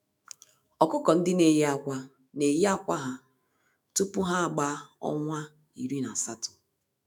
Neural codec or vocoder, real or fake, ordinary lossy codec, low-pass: autoencoder, 48 kHz, 128 numbers a frame, DAC-VAE, trained on Japanese speech; fake; none; none